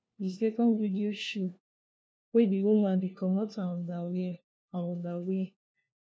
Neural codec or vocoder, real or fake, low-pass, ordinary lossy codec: codec, 16 kHz, 1 kbps, FunCodec, trained on LibriTTS, 50 frames a second; fake; none; none